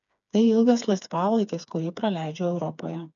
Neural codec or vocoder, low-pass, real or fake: codec, 16 kHz, 4 kbps, FreqCodec, smaller model; 7.2 kHz; fake